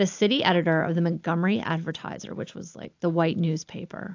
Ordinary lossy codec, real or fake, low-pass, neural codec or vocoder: AAC, 48 kbps; real; 7.2 kHz; none